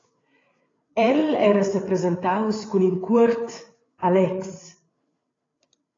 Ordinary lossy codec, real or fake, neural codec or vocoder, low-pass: AAC, 32 kbps; fake; codec, 16 kHz, 8 kbps, FreqCodec, larger model; 7.2 kHz